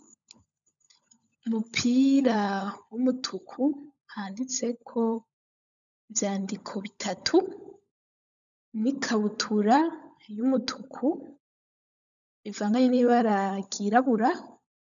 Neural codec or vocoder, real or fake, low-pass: codec, 16 kHz, 16 kbps, FunCodec, trained on LibriTTS, 50 frames a second; fake; 7.2 kHz